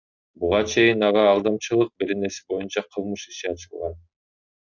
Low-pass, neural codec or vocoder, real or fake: 7.2 kHz; none; real